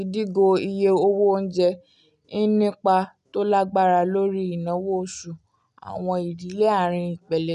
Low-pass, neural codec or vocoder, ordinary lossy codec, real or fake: 10.8 kHz; none; none; real